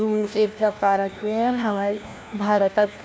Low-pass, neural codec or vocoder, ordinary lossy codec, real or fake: none; codec, 16 kHz, 1 kbps, FunCodec, trained on LibriTTS, 50 frames a second; none; fake